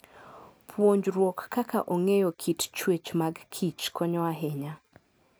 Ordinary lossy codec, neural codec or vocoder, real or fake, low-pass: none; none; real; none